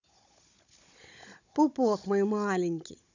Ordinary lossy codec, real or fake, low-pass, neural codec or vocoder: none; fake; 7.2 kHz; codec, 16 kHz, 16 kbps, FunCodec, trained on Chinese and English, 50 frames a second